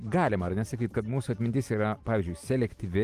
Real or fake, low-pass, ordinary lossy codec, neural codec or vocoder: real; 14.4 kHz; Opus, 24 kbps; none